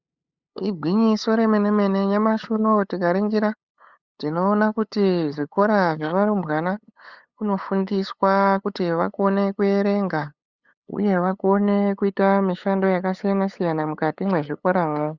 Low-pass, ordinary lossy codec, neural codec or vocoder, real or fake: 7.2 kHz; Opus, 64 kbps; codec, 16 kHz, 8 kbps, FunCodec, trained on LibriTTS, 25 frames a second; fake